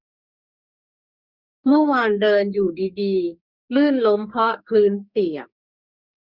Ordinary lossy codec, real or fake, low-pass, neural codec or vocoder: Opus, 64 kbps; fake; 5.4 kHz; codec, 44.1 kHz, 2.6 kbps, SNAC